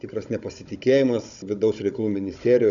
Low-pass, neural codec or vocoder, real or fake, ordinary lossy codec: 7.2 kHz; codec, 16 kHz, 16 kbps, FunCodec, trained on Chinese and English, 50 frames a second; fake; MP3, 96 kbps